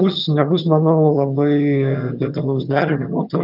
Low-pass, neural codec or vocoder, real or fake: 5.4 kHz; vocoder, 22.05 kHz, 80 mel bands, HiFi-GAN; fake